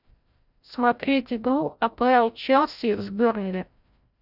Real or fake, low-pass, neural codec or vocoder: fake; 5.4 kHz; codec, 16 kHz, 0.5 kbps, FreqCodec, larger model